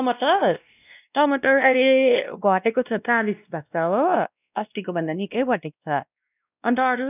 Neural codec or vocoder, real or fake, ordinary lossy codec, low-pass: codec, 16 kHz, 1 kbps, X-Codec, WavLM features, trained on Multilingual LibriSpeech; fake; none; 3.6 kHz